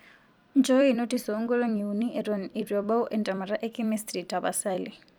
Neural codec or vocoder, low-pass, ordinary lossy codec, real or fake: vocoder, 44.1 kHz, 128 mel bands every 256 samples, BigVGAN v2; none; none; fake